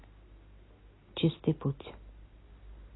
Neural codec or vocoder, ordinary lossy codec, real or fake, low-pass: none; AAC, 16 kbps; real; 7.2 kHz